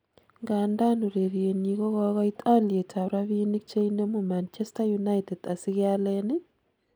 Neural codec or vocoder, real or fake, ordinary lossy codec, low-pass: none; real; none; none